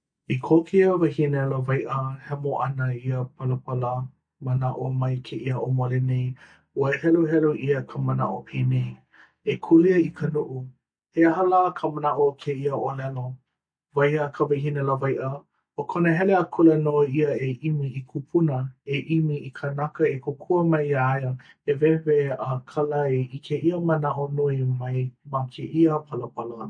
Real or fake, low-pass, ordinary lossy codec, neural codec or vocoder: real; 9.9 kHz; MP3, 48 kbps; none